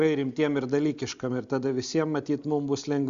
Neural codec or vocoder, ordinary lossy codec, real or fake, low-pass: none; Opus, 64 kbps; real; 7.2 kHz